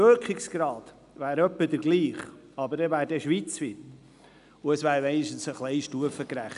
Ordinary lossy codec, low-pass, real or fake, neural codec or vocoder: none; 10.8 kHz; real; none